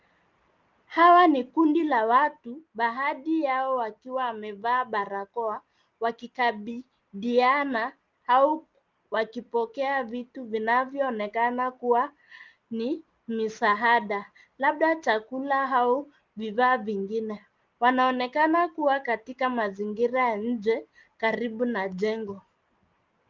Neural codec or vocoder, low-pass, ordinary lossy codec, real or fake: none; 7.2 kHz; Opus, 24 kbps; real